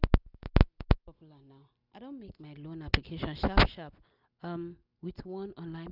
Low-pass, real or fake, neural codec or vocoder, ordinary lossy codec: 5.4 kHz; real; none; none